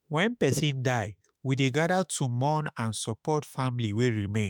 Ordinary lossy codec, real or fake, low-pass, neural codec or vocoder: none; fake; none; autoencoder, 48 kHz, 32 numbers a frame, DAC-VAE, trained on Japanese speech